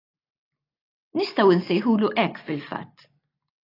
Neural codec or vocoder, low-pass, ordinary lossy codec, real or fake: none; 5.4 kHz; AAC, 24 kbps; real